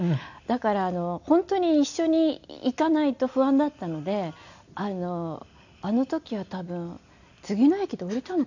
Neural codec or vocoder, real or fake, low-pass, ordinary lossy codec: none; real; 7.2 kHz; none